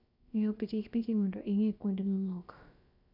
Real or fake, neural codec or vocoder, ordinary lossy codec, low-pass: fake; codec, 16 kHz, about 1 kbps, DyCAST, with the encoder's durations; none; 5.4 kHz